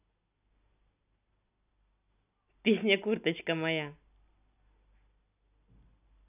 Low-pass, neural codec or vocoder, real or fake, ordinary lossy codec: 3.6 kHz; none; real; none